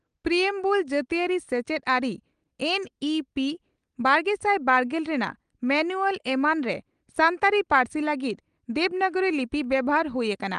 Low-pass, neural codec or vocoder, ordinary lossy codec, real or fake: 9.9 kHz; none; Opus, 24 kbps; real